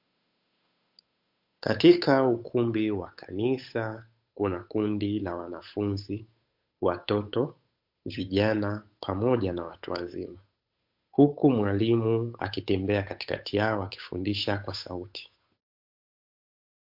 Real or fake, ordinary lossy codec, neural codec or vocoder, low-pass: fake; MP3, 48 kbps; codec, 16 kHz, 8 kbps, FunCodec, trained on Chinese and English, 25 frames a second; 5.4 kHz